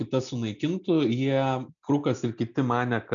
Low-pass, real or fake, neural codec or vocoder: 7.2 kHz; real; none